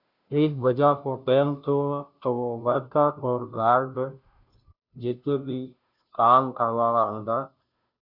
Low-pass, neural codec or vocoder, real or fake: 5.4 kHz; codec, 16 kHz, 0.5 kbps, FunCodec, trained on Chinese and English, 25 frames a second; fake